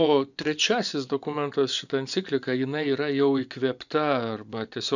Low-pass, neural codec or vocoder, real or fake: 7.2 kHz; vocoder, 24 kHz, 100 mel bands, Vocos; fake